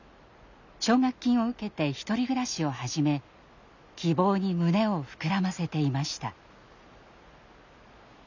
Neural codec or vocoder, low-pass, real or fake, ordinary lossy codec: none; 7.2 kHz; real; none